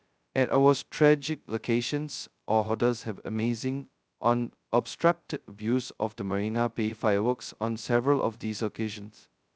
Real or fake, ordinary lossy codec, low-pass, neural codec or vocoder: fake; none; none; codec, 16 kHz, 0.2 kbps, FocalCodec